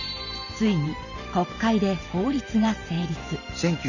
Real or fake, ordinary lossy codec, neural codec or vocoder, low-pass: fake; none; vocoder, 44.1 kHz, 128 mel bands every 512 samples, BigVGAN v2; 7.2 kHz